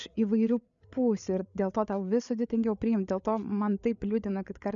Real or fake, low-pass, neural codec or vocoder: fake; 7.2 kHz; codec, 16 kHz, 8 kbps, FreqCodec, larger model